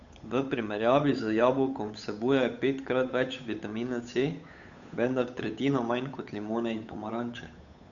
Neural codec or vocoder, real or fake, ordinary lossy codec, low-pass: codec, 16 kHz, 8 kbps, FunCodec, trained on Chinese and English, 25 frames a second; fake; none; 7.2 kHz